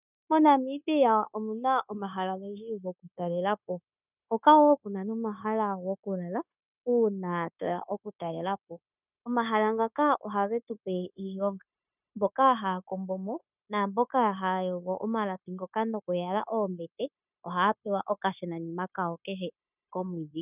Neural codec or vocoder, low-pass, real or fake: codec, 16 kHz, 0.9 kbps, LongCat-Audio-Codec; 3.6 kHz; fake